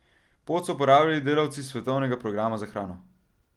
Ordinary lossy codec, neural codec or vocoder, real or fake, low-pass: Opus, 24 kbps; none; real; 19.8 kHz